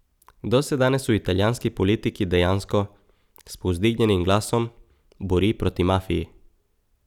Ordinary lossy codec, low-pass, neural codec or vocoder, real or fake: none; 19.8 kHz; none; real